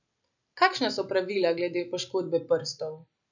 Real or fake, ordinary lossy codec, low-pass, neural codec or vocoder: real; none; 7.2 kHz; none